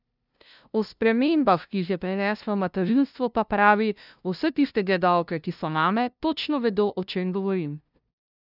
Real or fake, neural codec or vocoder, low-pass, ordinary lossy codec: fake; codec, 16 kHz, 0.5 kbps, FunCodec, trained on LibriTTS, 25 frames a second; 5.4 kHz; none